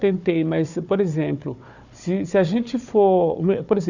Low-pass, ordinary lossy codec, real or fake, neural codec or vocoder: 7.2 kHz; none; fake; codec, 16 kHz, 4 kbps, FunCodec, trained on Chinese and English, 50 frames a second